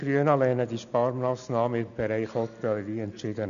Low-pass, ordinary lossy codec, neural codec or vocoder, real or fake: 7.2 kHz; none; none; real